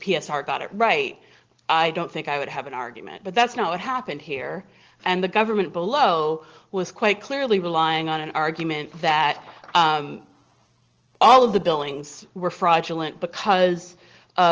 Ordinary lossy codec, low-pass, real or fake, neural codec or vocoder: Opus, 16 kbps; 7.2 kHz; real; none